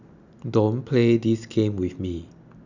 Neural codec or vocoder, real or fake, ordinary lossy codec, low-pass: none; real; none; 7.2 kHz